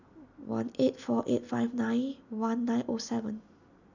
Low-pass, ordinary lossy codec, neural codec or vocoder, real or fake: 7.2 kHz; none; none; real